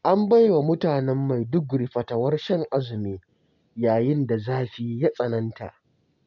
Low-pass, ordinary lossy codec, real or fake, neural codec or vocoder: 7.2 kHz; none; real; none